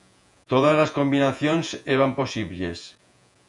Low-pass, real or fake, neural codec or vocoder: 10.8 kHz; fake; vocoder, 48 kHz, 128 mel bands, Vocos